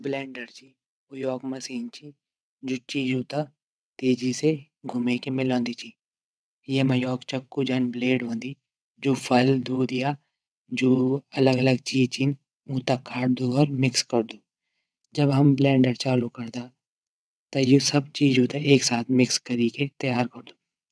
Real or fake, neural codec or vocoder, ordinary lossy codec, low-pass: fake; vocoder, 22.05 kHz, 80 mel bands, WaveNeXt; none; none